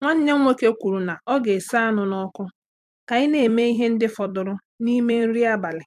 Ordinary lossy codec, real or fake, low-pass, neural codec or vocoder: MP3, 96 kbps; fake; 14.4 kHz; vocoder, 44.1 kHz, 128 mel bands every 256 samples, BigVGAN v2